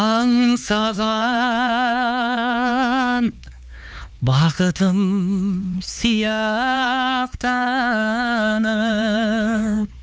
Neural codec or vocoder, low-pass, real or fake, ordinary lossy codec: codec, 16 kHz, 4 kbps, X-Codec, HuBERT features, trained on LibriSpeech; none; fake; none